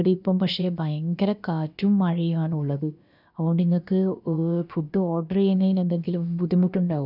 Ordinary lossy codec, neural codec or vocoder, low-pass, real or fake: none; codec, 16 kHz, about 1 kbps, DyCAST, with the encoder's durations; 5.4 kHz; fake